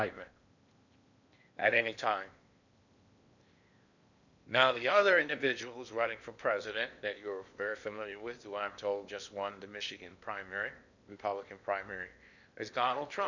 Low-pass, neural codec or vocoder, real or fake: 7.2 kHz; codec, 16 kHz in and 24 kHz out, 0.8 kbps, FocalCodec, streaming, 65536 codes; fake